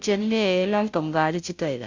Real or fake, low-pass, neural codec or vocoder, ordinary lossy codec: fake; 7.2 kHz; codec, 16 kHz, 0.5 kbps, FunCodec, trained on Chinese and English, 25 frames a second; MP3, 64 kbps